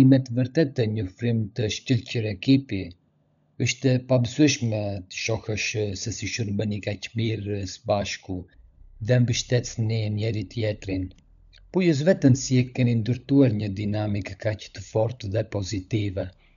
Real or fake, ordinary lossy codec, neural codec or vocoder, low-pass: fake; none; codec, 16 kHz, 16 kbps, FunCodec, trained on LibriTTS, 50 frames a second; 7.2 kHz